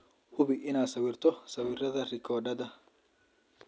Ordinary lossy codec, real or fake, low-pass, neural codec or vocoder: none; real; none; none